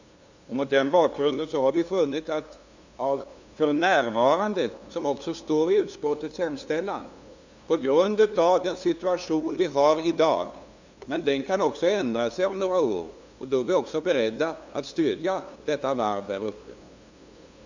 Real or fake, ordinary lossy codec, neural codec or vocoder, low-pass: fake; Opus, 64 kbps; codec, 16 kHz, 2 kbps, FunCodec, trained on LibriTTS, 25 frames a second; 7.2 kHz